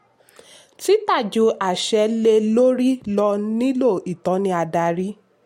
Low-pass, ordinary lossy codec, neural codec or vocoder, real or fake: 19.8 kHz; MP3, 64 kbps; none; real